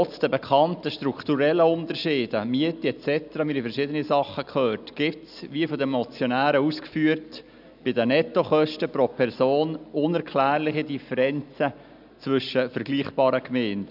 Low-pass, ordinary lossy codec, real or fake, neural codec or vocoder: 5.4 kHz; none; real; none